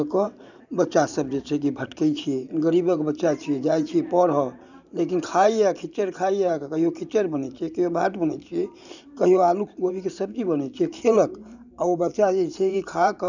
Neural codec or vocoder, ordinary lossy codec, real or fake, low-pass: vocoder, 44.1 kHz, 128 mel bands every 512 samples, BigVGAN v2; none; fake; 7.2 kHz